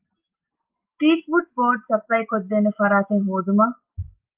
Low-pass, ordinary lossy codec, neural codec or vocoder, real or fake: 3.6 kHz; Opus, 24 kbps; none; real